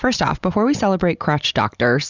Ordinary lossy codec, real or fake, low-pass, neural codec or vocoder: Opus, 64 kbps; real; 7.2 kHz; none